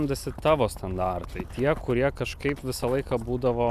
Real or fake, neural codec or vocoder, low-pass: fake; vocoder, 44.1 kHz, 128 mel bands every 256 samples, BigVGAN v2; 14.4 kHz